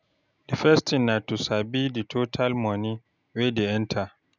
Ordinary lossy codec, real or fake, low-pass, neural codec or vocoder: none; real; 7.2 kHz; none